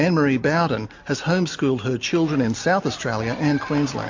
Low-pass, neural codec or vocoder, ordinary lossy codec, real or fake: 7.2 kHz; none; MP3, 48 kbps; real